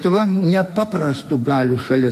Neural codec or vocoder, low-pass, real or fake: autoencoder, 48 kHz, 32 numbers a frame, DAC-VAE, trained on Japanese speech; 14.4 kHz; fake